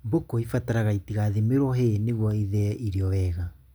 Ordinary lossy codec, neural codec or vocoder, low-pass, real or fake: none; none; none; real